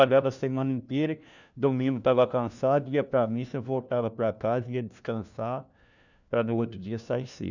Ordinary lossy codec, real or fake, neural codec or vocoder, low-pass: none; fake; codec, 16 kHz, 1 kbps, FunCodec, trained on LibriTTS, 50 frames a second; 7.2 kHz